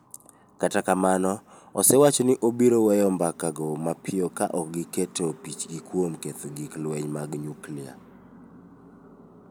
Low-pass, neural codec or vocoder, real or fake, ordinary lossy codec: none; none; real; none